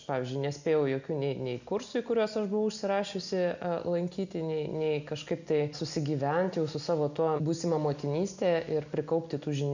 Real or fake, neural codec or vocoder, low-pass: real; none; 7.2 kHz